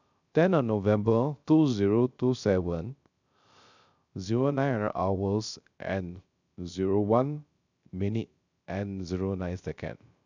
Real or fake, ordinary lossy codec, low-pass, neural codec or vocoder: fake; none; 7.2 kHz; codec, 16 kHz, 0.3 kbps, FocalCodec